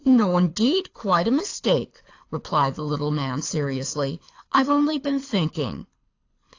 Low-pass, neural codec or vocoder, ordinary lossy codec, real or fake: 7.2 kHz; codec, 24 kHz, 6 kbps, HILCodec; AAC, 48 kbps; fake